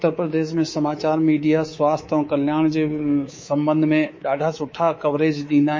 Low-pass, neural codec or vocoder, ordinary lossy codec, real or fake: 7.2 kHz; codec, 24 kHz, 3.1 kbps, DualCodec; MP3, 32 kbps; fake